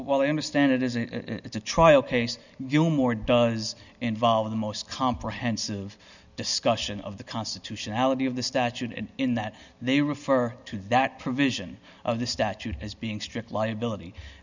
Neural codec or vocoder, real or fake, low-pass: none; real; 7.2 kHz